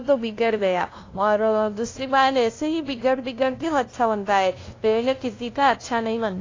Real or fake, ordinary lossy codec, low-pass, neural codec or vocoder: fake; AAC, 32 kbps; 7.2 kHz; codec, 16 kHz, 0.5 kbps, FunCodec, trained on LibriTTS, 25 frames a second